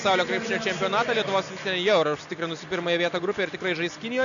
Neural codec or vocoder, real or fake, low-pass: none; real; 7.2 kHz